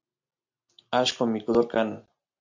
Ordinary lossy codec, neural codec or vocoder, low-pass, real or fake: MP3, 64 kbps; none; 7.2 kHz; real